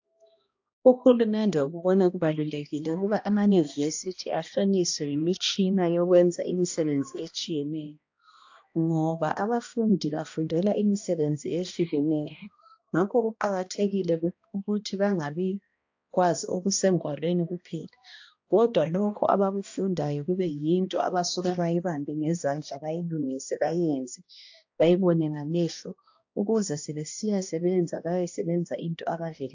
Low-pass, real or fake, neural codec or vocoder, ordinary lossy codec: 7.2 kHz; fake; codec, 16 kHz, 1 kbps, X-Codec, HuBERT features, trained on balanced general audio; AAC, 48 kbps